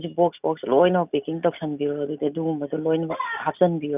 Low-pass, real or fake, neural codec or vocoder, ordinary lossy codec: 3.6 kHz; real; none; none